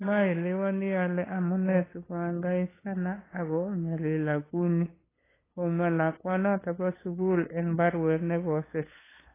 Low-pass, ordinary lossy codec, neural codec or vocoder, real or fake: 3.6 kHz; AAC, 16 kbps; codec, 16 kHz, 8 kbps, FunCodec, trained on LibriTTS, 25 frames a second; fake